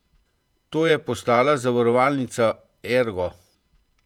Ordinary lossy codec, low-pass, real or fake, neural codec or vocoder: none; 19.8 kHz; fake; vocoder, 48 kHz, 128 mel bands, Vocos